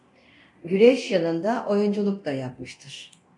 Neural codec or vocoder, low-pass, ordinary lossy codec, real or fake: codec, 24 kHz, 0.9 kbps, DualCodec; 10.8 kHz; MP3, 64 kbps; fake